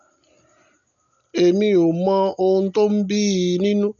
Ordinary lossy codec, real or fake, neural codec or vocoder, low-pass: MP3, 64 kbps; real; none; 7.2 kHz